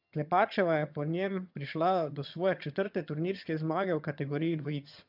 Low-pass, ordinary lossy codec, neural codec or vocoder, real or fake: 5.4 kHz; none; vocoder, 22.05 kHz, 80 mel bands, HiFi-GAN; fake